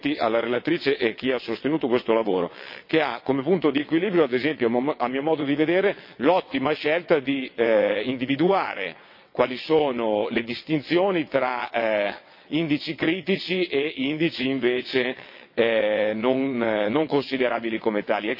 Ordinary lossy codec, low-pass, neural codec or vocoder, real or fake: MP3, 32 kbps; 5.4 kHz; vocoder, 22.05 kHz, 80 mel bands, WaveNeXt; fake